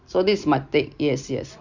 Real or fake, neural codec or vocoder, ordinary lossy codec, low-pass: real; none; Opus, 64 kbps; 7.2 kHz